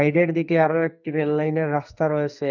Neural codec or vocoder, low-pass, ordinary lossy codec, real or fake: codec, 44.1 kHz, 2.6 kbps, SNAC; 7.2 kHz; none; fake